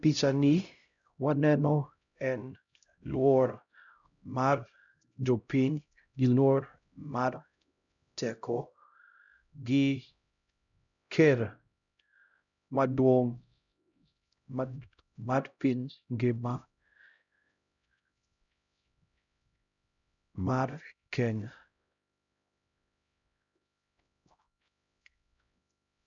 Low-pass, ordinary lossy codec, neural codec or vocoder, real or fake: 7.2 kHz; none; codec, 16 kHz, 0.5 kbps, X-Codec, HuBERT features, trained on LibriSpeech; fake